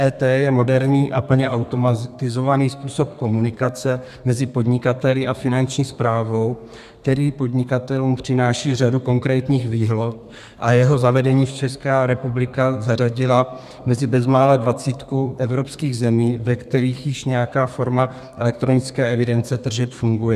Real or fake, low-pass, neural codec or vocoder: fake; 14.4 kHz; codec, 32 kHz, 1.9 kbps, SNAC